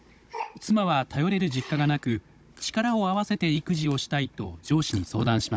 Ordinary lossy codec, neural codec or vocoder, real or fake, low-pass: none; codec, 16 kHz, 16 kbps, FunCodec, trained on Chinese and English, 50 frames a second; fake; none